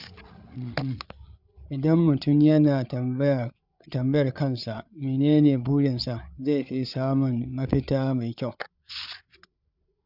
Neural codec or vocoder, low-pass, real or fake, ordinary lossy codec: codec, 16 kHz, 8 kbps, FreqCodec, larger model; 5.4 kHz; fake; none